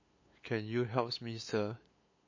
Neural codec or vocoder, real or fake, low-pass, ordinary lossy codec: codec, 16 kHz, 8 kbps, FunCodec, trained on LibriTTS, 25 frames a second; fake; 7.2 kHz; MP3, 32 kbps